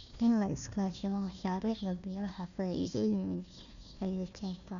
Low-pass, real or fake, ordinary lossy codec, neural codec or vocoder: 7.2 kHz; fake; none; codec, 16 kHz, 1 kbps, FunCodec, trained on Chinese and English, 50 frames a second